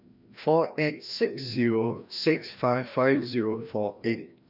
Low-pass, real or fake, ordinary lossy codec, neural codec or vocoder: 5.4 kHz; fake; none; codec, 16 kHz, 1 kbps, FreqCodec, larger model